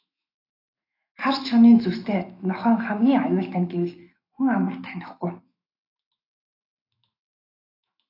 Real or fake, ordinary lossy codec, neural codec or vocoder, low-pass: fake; AAC, 32 kbps; autoencoder, 48 kHz, 128 numbers a frame, DAC-VAE, trained on Japanese speech; 5.4 kHz